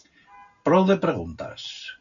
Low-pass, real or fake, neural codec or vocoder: 7.2 kHz; real; none